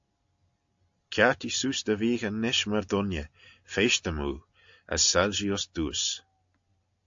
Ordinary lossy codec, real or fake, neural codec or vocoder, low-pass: AAC, 64 kbps; real; none; 7.2 kHz